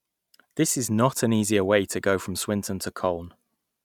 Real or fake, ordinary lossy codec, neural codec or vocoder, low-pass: real; none; none; 19.8 kHz